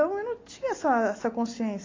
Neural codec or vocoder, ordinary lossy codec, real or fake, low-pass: none; AAC, 32 kbps; real; 7.2 kHz